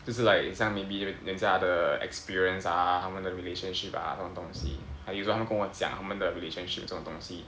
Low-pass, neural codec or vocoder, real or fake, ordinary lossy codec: none; none; real; none